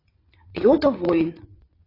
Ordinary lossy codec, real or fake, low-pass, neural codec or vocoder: AAC, 24 kbps; fake; 5.4 kHz; vocoder, 22.05 kHz, 80 mel bands, WaveNeXt